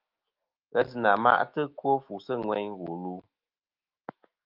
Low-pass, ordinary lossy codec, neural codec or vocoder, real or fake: 5.4 kHz; Opus, 24 kbps; autoencoder, 48 kHz, 128 numbers a frame, DAC-VAE, trained on Japanese speech; fake